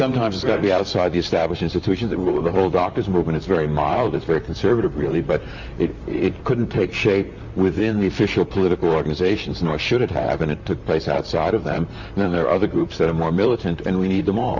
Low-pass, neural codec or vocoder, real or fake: 7.2 kHz; vocoder, 44.1 kHz, 128 mel bands, Pupu-Vocoder; fake